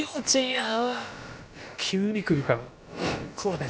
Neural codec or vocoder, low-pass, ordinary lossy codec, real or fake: codec, 16 kHz, about 1 kbps, DyCAST, with the encoder's durations; none; none; fake